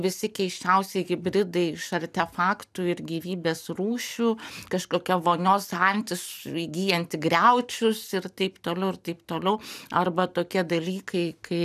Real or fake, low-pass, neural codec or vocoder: real; 14.4 kHz; none